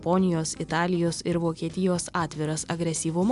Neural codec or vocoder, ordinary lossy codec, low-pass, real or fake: none; AAC, 96 kbps; 10.8 kHz; real